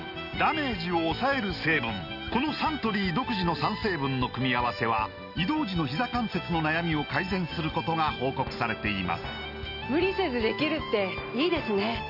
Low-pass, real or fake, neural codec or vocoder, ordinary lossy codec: 5.4 kHz; real; none; none